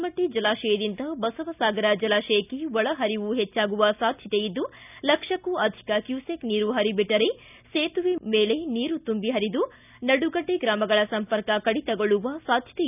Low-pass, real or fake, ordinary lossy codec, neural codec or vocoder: 3.6 kHz; real; none; none